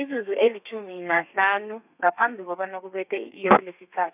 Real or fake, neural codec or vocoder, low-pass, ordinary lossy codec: fake; codec, 32 kHz, 1.9 kbps, SNAC; 3.6 kHz; none